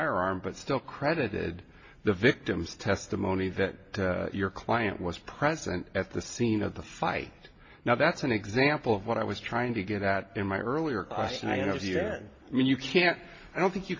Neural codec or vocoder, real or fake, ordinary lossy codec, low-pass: none; real; MP3, 32 kbps; 7.2 kHz